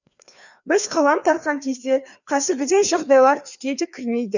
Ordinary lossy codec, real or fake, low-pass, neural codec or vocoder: none; fake; 7.2 kHz; codec, 16 kHz, 2 kbps, FreqCodec, larger model